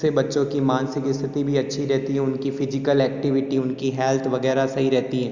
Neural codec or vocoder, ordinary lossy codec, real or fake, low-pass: none; none; real; 7.2 kHz